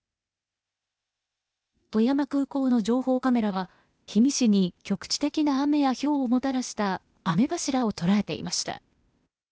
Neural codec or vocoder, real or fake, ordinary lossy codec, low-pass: codec, 16 kHz, 0.8 kbps, ZipCodec; fake; none; none